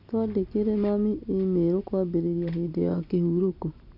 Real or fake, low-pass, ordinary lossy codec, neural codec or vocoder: real; 5.4 kHz; none; none